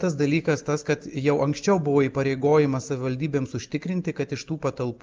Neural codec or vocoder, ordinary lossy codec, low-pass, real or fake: none; Opus, 24 kbps; 7.2 kHz; real